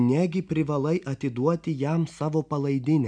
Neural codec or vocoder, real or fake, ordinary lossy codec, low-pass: none; real; MP3, 96 kbps; 9.9 kHz